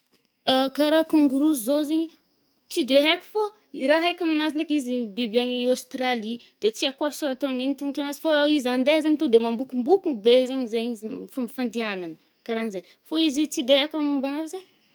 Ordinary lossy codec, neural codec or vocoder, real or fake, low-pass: none; codec, 44.1 kHz, 2.6 kbps, SNAC; fake; none